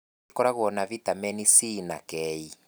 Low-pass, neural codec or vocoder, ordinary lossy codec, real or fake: none; none; none; real